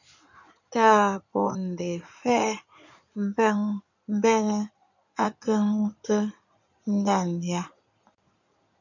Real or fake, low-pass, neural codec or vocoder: fake; 7.2 kHz; codec, 16 kHz in and 24 kHz out, 2.2 kbps, FireRedTTS-2 codec